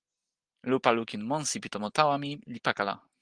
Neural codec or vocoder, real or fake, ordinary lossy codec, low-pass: none; real; Opus, 24 kbps; 9.9 kHz